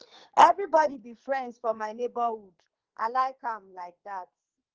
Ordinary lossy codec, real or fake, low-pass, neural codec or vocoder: Opus, 16 kbps; fake; 7.2 kHz; codec, 44.1 kHz, 2.6 kbps, SNAC